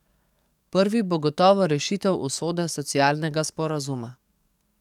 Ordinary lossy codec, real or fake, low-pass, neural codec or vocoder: none; fake; 19.8 kHz; codec, 44.1 kHz, 7.8 kbps, DAC